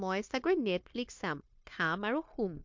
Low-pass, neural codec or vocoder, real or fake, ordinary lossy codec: 7.2 kHz; codec, 16 kHz, 0.9 kbps, LongCat-Audio-Codec; fake; MP3, 48 kbps